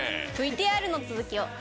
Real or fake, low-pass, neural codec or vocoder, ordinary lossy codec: real; none; none; none